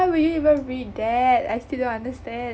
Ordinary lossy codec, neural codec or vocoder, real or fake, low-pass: none; none; real; none